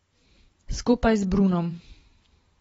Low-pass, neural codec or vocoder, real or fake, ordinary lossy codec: 19.8 kHz; vocoder, 44.1 kHz, 128 mel bands every 512 samples, BigVGAN v2; fake; AAC, 24 kbps